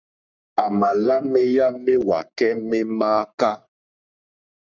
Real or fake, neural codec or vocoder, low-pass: fake; codec, 44.1 kHz, 3.4 kbps, Pupu-Codec; 7.2 kHz